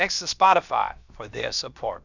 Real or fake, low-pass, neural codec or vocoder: fake; 7.2 kHz; codec, 16 kHz, about 1 kbps, DyCAST, with the encoder's durations